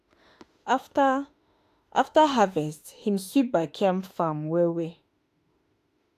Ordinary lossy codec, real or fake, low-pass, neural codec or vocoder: AAC, 64 kbps; fake; 14.4 kHz; autoencoder, 48 kHz, 32 numbers a frame, DAC-VAE, trained on Japanese speech